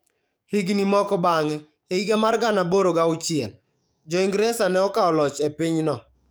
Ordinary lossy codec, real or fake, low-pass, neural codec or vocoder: none; fake; none; codec, 44.1 kHz, 7.8 kbps, DAC